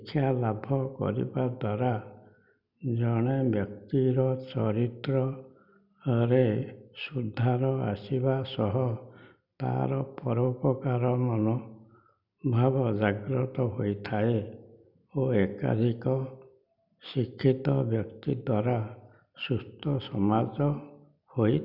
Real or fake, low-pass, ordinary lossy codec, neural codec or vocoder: real; 5.4 kHz; none; none